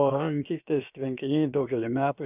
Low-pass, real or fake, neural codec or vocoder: 3.6 kHz; fake; codec, 16 kHz, about 1 kbps, DyCAST, with the encoder's durations